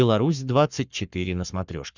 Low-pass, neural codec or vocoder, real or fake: 7.2 kHz; codec, 44.1 kHz, 7.8 kbps, Pupu-Codec; fake